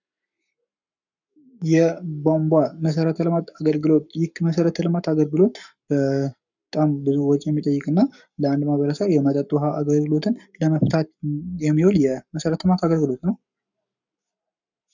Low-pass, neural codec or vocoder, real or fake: 7.2 kHz; codec, 44.1 kHz, 7.8 kbps, Pupu-Codec; fake